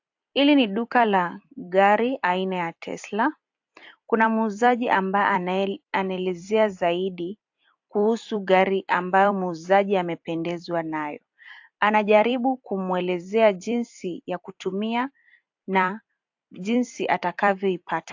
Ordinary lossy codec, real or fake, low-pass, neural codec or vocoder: AAC, 48 kbps; fake; 7.2 kHz; vocoder, 44.1 kHz, 128 mel bands every 256 samples, BigVGAN v2